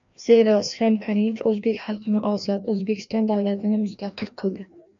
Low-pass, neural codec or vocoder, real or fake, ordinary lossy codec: 7.2 kHz; codec, 16 kHz, 1 kbps, FreqCodec, larger model; fake; AAC, 64 kbps